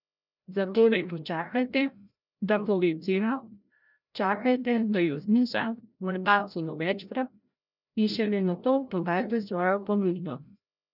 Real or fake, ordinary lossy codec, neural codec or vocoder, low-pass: fake; none; codec, 16 kHz, 0.5 kbps, FreqCodec, larger model; 5.4 kHz